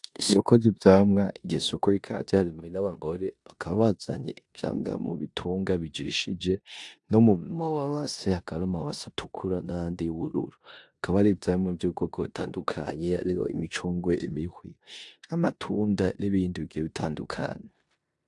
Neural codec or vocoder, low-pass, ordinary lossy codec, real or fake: codec, 16 kHz in and 24 kHz out, 0.9 kbps, LongCat-Audio-Codec, four codebook decoder; 10.8 kHz; AAC, 64 kbps; fake